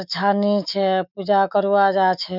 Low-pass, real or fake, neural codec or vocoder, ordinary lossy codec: 5.4 kHz; fake; autoencoder, 48 kHz, 128 numbers a frame, DAC-VAE, trained on Japanese speech; none